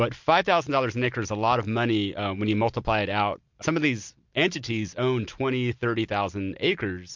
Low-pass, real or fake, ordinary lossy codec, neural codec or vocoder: 7.2 kHz; real; MP3, 64 kbps; none